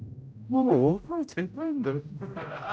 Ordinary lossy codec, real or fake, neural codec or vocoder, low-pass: none; fake; codec, 16 kHz, 0.5 kbps, X-Codec, HuBERT features, trained on general audio; none